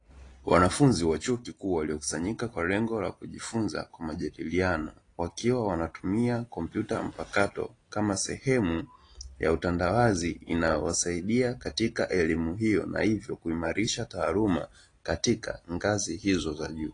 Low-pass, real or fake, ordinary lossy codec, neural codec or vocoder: 9.9 kHz; real; AAC, 32 kbps; none